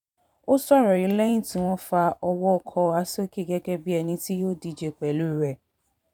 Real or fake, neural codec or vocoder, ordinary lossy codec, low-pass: fake; vocoder, 44.1 kHz, 128 mel bands every 512 samples, BigVGAN v2; none; 19.8 kHz